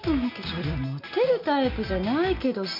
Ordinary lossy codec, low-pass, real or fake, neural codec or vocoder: none; 5.4 kHz; real; none